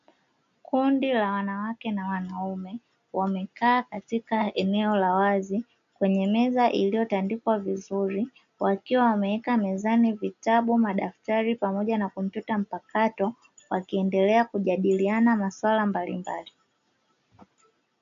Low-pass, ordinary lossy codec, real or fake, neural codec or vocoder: 7.2 kHz; MP3, 64 kbps; real; none